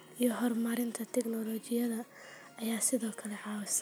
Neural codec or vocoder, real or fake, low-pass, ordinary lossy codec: none; real; none; none